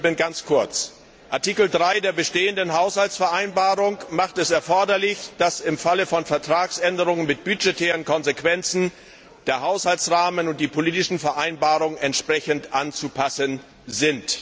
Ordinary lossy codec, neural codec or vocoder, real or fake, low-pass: none; none; real; none